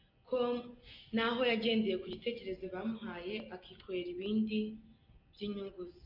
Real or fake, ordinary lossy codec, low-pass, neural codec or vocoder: real; MP3, 48 kbps; 5.4 kHz; none